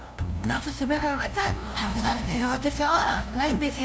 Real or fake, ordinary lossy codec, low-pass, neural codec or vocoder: fake; none; none; codec, 16 kHz, 0.5 kbps, FunCodec, trained on LibriTTS, 25 frames a second